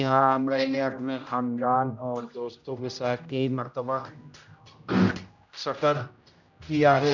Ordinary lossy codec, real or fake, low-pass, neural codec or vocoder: none; fake; 7.2 kHz; codec, 16 kHz, 0.5 kbps, X-Codec, HuBERT features, trained on general audio